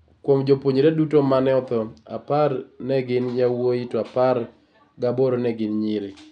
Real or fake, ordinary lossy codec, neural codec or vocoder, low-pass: real; none; none; 9.9 kHz